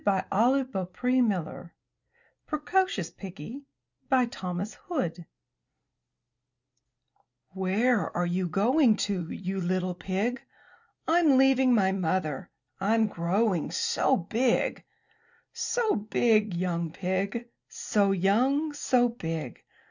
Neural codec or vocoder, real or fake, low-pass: none; real; 7.2 kHz